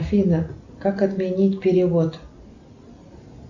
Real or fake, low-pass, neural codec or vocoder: real; 7.2 kHz; none